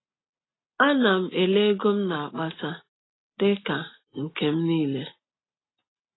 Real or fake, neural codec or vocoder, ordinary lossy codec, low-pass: real; none; AAC, 16 kbps; 7.2 kHz